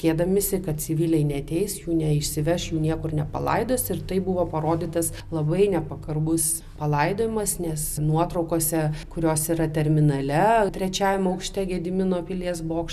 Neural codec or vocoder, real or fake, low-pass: none; real; 14.4 kHz